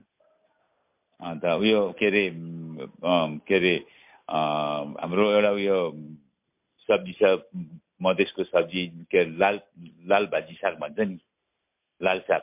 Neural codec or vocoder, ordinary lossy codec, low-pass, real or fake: none; MP3, 32 kbps; 3.6 kHz; real